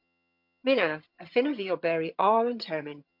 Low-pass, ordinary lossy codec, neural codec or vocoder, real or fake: 5.4 kHz; MP3, 48 kbps; vocoder, 22.05 kHz, 80 mel bands, HiFi-GAN; fake